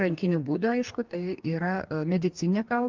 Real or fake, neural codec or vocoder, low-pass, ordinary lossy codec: fake; codec, 44.1 kHz, 2.6 kbps, DAC; 7.2 kHz; Opus, 24 kbps